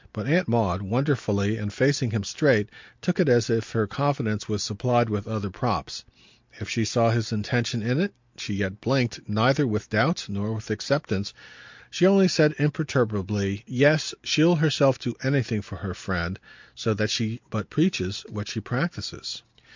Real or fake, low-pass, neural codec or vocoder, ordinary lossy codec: real; 7.2 kHz; none; MP3, 64 kbps